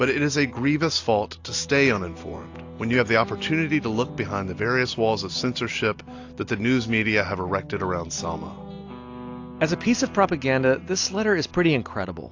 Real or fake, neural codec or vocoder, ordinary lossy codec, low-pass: real; none; AAC, 48 kbps; 7.2 kHz